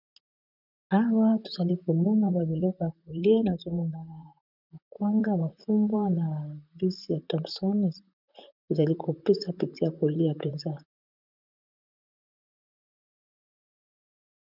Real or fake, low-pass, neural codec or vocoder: fake; 5.4 kHz; vocoder, 44.1 kHz, 128 mel bands, Pupu-Vocoder